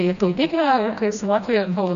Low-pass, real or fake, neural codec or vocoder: 7.2 kHz; fake; codec, 16 kHz, 1 kbps, FreqCodec, smaller model